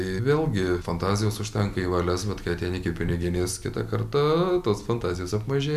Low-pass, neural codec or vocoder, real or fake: 14.4 kHz; none; real